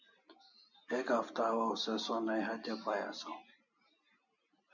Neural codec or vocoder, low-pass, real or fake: none; 7.2 kHz; real